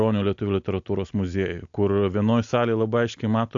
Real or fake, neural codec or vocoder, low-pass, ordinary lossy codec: real; none; 7.2 kHz; AAC, 64 kbps